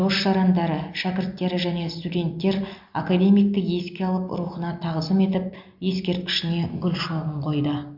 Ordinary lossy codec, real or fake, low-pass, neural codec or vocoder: MP3, 48 kbps; real; 5.4 kHz; none